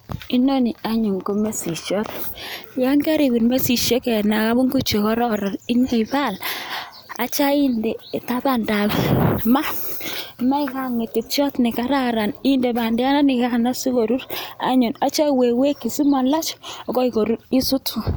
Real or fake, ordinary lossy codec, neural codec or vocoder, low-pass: real; none; none; none